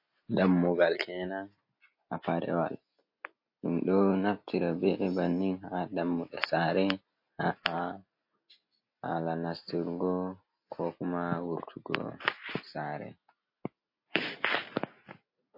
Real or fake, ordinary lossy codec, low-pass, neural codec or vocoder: real; MP3, 32 kbps; 5.4 kHz; none